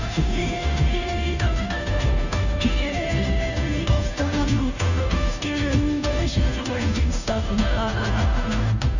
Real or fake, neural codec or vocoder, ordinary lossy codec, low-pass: fake; codec, 16 kHz, 0.5 kbps, FunCodec, trained on Chinese and English, 25 frames a second; none; 7.2 kHz